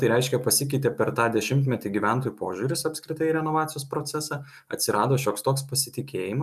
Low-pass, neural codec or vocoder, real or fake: 14.4 kHz; none; real